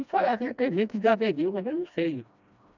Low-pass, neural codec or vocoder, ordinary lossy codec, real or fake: 7.2 kHz; codec, 16 kHz, 1 kbps, FreqCodec, smaller model; none; fake